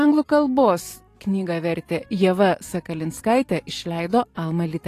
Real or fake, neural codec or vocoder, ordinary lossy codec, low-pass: fake; vocoder, 44.1 kHz, 128 mel bands every 512 samples, BigVGAN v2; AAC, 48 kbps; 14.4 kHz